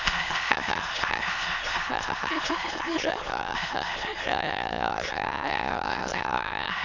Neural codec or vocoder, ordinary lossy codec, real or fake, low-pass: autoencoder, 22.05 kHz, a latent of 192 numbers a frame, VITS, trained on many speakers; none; fake; 7.2 kHz